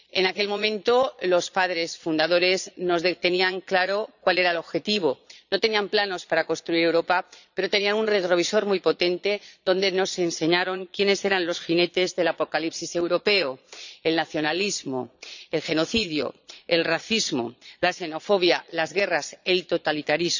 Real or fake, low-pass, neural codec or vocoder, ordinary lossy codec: fake; 7.2 kHz; vocoder, 22.05 kHz, 80 mel bands, Vocos; none